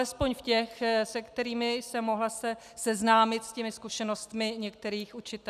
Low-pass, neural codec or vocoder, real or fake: 14.4 kHz; none; real